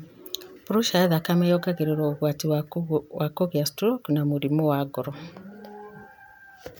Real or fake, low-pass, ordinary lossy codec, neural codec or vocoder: real; none; none; none